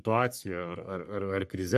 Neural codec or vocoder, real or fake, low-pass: codec, 44.1 kHz, 3.4 kbps, Pupu-Codec; fake; 14.4 kHz